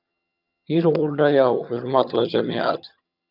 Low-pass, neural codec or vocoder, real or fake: 5.4 kHz; vocoder, 22.05 kHz, 80 mel bands, HiFi-GAN; fake